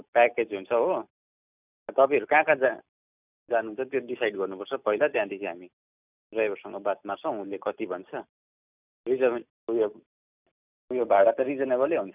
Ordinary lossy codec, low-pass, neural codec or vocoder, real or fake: none; 3.6 kHz; none; real